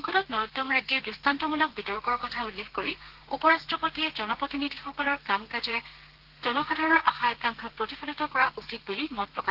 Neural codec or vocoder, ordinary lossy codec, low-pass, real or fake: codec, 32 kHz, 1.9 kbps, SNAC; Opus, 16 kbps; 5.4 kHz; fake